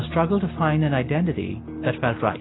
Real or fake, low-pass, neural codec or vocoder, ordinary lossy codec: real; 7.2 kHz; none; AAC, 16 kbps